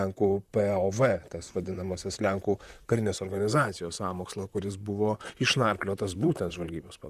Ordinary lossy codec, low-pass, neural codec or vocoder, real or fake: Opus, 64 kbps; 14.4 kHz; vocoder, 44.1 kHz, 128 mel bands, Pupu-Vocoder; fake